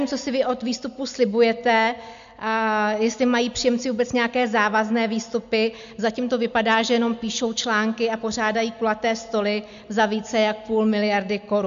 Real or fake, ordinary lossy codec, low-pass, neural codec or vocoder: real; MP3, 64 kbps; 7.2 kHz; none